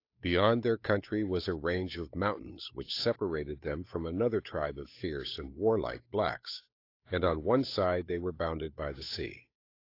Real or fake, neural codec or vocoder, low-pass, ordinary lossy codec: fake; codec, 16 kHz, 8 kbps, FunCodec, trained on Chinese and English, 25 frames a second; 5.4 kHz; AAC, 32 kbps